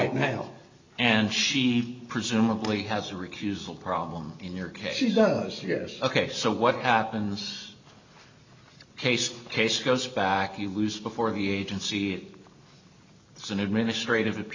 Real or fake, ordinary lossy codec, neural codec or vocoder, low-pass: real; MP3, 64 kbps; none; 7.2 kHz